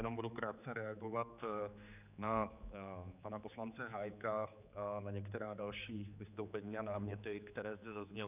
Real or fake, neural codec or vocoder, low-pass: fake; codec, 16 kHz, 4 kbps, X-Codec, HuBERT features, trained on general audio; 3.6 kHz